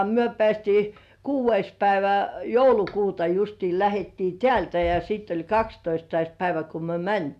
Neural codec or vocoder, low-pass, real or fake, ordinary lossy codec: none; 14.4 kHz; real; none